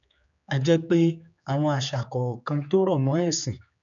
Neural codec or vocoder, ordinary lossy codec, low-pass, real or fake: codec, 16 kHz, 4 kbps, X-Codec, HuBERT features, trained on general audio; none; 7.2 kHz; fake